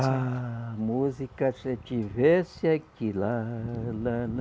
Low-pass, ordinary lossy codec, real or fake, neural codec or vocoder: none; none; real; none